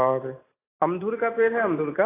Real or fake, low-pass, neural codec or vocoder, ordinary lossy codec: real; 3.6 kHz; none; AAC, 16 kbps